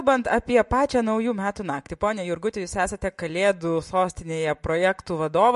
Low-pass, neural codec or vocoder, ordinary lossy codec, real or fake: 14.4 kHz; none; MP3, 48 kbps; real